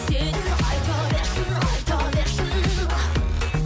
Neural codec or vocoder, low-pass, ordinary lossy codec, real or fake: none; none; none; real